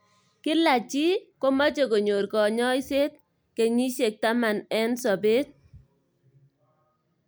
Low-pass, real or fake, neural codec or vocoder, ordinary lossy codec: none; real; none; none